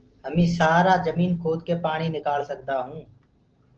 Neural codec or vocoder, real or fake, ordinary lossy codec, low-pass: none; real; Opus, 16 kbps; 7.2 kHz